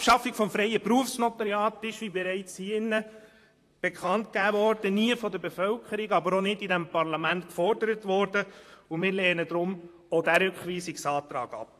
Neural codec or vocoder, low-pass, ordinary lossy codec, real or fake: vocoder, 44.1 kHz, 128 mel bands, Pupu-Vocoder; 14.4 kHz; AAC, 64 kbps; fake